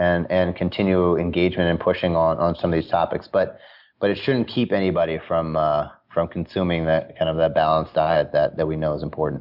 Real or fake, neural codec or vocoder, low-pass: real; none; 5.4 kHz